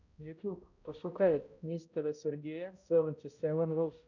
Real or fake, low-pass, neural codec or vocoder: fake; 7.2 kHz; codec, 16 kHz, 0.5 kbps, X-Codec, HuBERT features, trained on balanced general audio